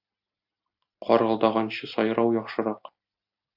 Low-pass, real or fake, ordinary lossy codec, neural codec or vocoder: 5.4 kHz; real; AAC, 48 kbps; none